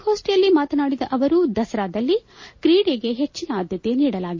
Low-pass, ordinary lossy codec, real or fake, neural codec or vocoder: 7.2 kHz; MP3, 32 kbps; real; none